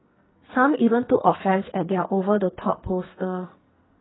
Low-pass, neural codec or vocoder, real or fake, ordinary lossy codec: 7.2 kHz; codec, 44.1 kHz, 2.6 kbps, SNAC; fake; AAC, 16 kbps